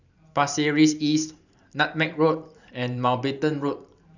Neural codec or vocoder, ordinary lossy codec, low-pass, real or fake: vocoder, 44.1 kHz, 128 mel bands every 512 samples, BigVGAN v2; none; 7.2 kHz; fake